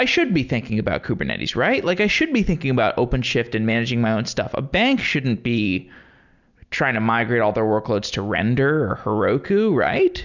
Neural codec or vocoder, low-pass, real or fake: none; 7.2 kHz; real